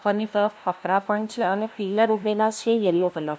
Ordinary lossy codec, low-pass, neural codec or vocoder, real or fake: none; none; codec, 16 kHz, 0.5 kbps, FunCodec, trained on LibriTTS, 25 frames a second; fake